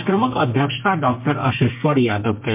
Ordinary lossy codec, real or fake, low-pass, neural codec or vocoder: MP3, 32 kbps; fake; 3.6 kHz; codec, 32 kHz, 1.9 kbps, SNAC